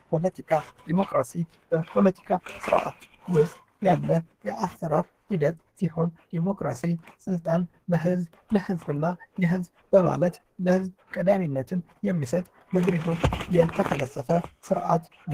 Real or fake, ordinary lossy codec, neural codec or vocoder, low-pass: fake; Opus, 16 kbps; codec, 32 kHz, 1.9 kbps, SNAC; 14.4 kHz